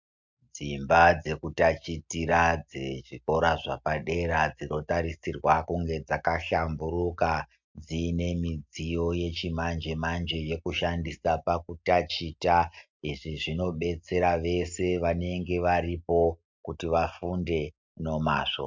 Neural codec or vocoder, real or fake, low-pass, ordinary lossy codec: none; real; 7.2 kHz; MP3, 64 kbps